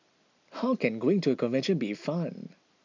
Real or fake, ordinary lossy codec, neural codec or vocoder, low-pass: real; MP3, 64 kbps; none; 7.2 kHz